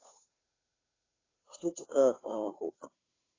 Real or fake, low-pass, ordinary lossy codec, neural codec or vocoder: fake; 7.2 kHz; AAC, 32 kbps; codec, 16 kHz, 2 kbps, FunCodec, trained on Chinese and English, 25 frames a second